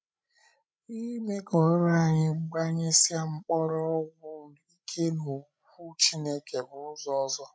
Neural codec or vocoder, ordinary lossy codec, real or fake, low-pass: codec, 16 kHz, 16 kbps, FreqCodec, larger model; none; fake; none